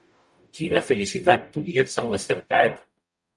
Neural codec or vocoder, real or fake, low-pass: codec, 44.1 kHz, 0.9 kbps, DAC; fake; 10.8 kHz